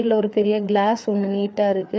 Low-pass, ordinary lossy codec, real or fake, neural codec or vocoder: none; none; fake; codec, 16 kHz, 4 kbps, FreqCodec, larger model